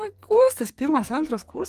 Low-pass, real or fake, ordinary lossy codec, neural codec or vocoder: 14.4 kHz; fake; Opus, 32 kbps; codec, 44.1 kHz, 2.6 kbps, SNAC